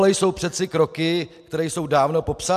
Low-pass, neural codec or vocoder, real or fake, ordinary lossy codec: 14.4 kHz; none; real; AAC, 96 kbps